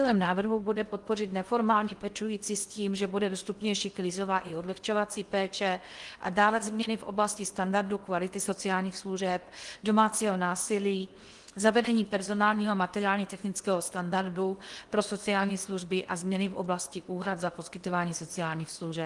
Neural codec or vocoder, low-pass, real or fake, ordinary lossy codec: codec, 16 kHz in and 24 kHz out, 0.8 kbps, FocalCodec, streaming, 65536 codes; 10.8 kHz; fake; Opus, 24 kbps